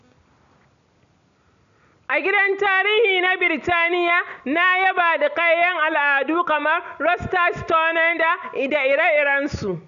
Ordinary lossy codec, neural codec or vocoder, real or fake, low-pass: none; none; real; 7.2 kHz